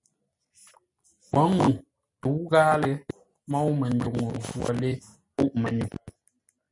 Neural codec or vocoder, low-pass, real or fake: none; 10.8 kHz; real